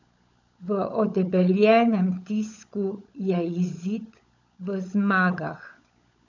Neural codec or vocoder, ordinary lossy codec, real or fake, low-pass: codec, 16 kHz, 16 kbps, FunCodec, trained on LibriTTS, 50 frames a second; none; fake; 7.2 kHz